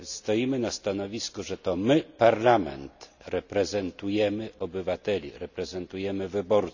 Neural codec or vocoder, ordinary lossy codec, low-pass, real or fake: none; none; 7.2 kHz; real